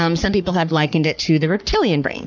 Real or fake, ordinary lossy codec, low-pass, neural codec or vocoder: fake; MP3, 64 kbps; 7.2 kHz; codec, 44.1 kHz, 3.4 kbps, Pupu-Codec